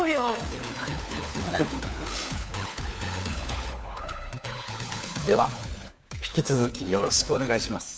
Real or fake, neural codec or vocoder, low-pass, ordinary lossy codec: fake; codec, 16 kHz, 4 kbps, FunCodec, trained on LibriTTS, 50 frames a second; none; none